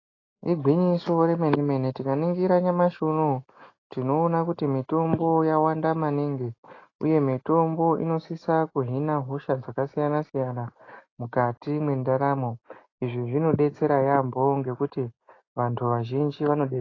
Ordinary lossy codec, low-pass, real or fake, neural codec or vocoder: AAC, 32 kbps; 7.2 kHz; real; none